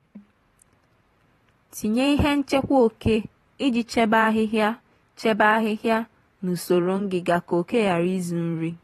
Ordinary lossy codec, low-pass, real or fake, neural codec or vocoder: AAC, 32 kbps; 19.8 kHz; fake; vocoder, 44.1 kHz, 128 mel bands every 512 samples, BigVGAN v2